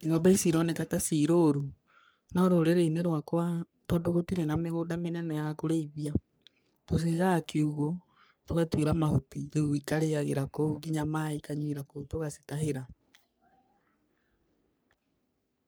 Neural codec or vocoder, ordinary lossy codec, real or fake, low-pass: codec, 44.1 kHz, 3.4 kbps, Pupu-Codec; none; fake; none